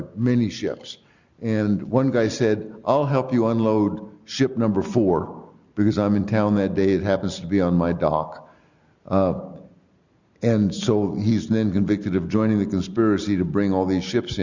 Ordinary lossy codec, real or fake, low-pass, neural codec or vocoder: Opus, 64 kbps; real; 7.2 kHz; none